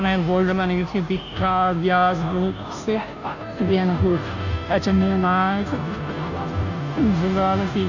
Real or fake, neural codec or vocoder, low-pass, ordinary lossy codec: fake; codec, 16 kHz, 0.5 kbps, FunCodec, trained on Chinese and English, 25 frames a second; 7.2 kHz; none